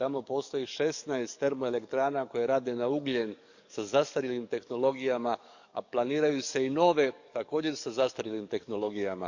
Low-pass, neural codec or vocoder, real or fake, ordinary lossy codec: 7.2 kHz; codec, 44.1 kHz, 7.8 kbps, DAC; fake; none